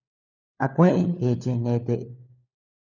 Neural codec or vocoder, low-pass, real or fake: codec, 16 kHz, 4 kbps, FunCodec, trained on LibriTTS, 50 frames a second; 7.2 kHz; fake